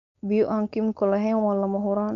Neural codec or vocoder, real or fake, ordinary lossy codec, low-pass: codec, 16 kHz, 4.8 kbps, FACodec; fake; none; 7.2 kHz